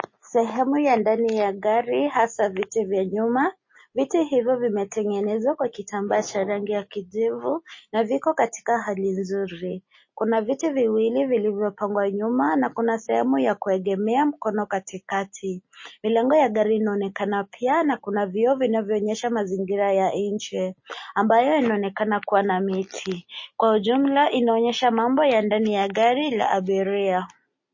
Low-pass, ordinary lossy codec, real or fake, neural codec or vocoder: 7.2 kHz; MP3, 32 kbps; real; none